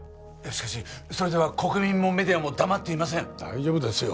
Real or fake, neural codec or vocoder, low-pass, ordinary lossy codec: real; none; none; none